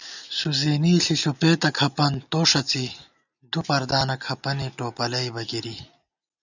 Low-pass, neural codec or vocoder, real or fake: 7.2 kHz; none; real